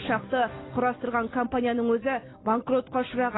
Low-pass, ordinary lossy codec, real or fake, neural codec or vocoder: 7.2 kHz; AAC, 16 kbps; real; none